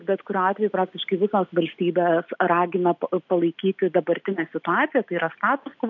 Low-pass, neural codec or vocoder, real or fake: 7.2 kHz; none; real